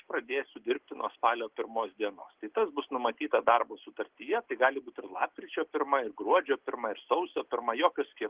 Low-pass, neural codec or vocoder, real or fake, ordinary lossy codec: 3.6 kHz; none; real; Opus, 16 kbps